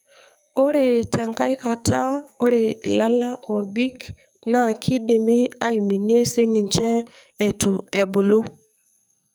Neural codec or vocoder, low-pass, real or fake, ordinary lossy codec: codec, 44.1 kHz, 2.6 kbps, SNAC; none; fake; none